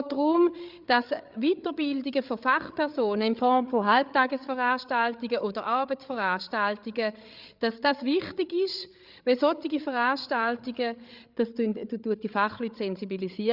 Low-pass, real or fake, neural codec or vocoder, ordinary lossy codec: 5.4 kHz; fake; codec, 16 kHz, 8 kbps, FreqCodec, larger model; Opus, 64 kbps